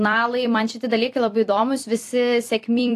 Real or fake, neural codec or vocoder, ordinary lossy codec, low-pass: fake; vocoder, 44.1 kHz, 128 mel bands every 256 samples, BigVGAN v2; AAC, 64 kbps; 14.4 kHz